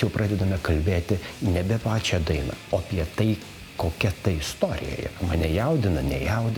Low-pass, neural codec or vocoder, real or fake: 19.8 kHz; none; real